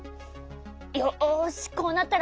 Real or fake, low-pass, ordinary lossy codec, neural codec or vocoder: real; none; none; none